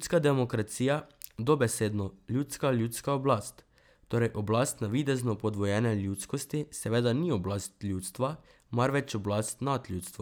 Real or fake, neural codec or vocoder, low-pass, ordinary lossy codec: real; none; none; none